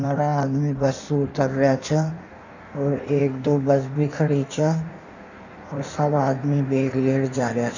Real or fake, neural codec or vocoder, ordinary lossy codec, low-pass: fake; codec, 16 kHz in and 24 kHz out, 1.1 kbps, FireRedTTS-2 codec; none; 7.2 kHz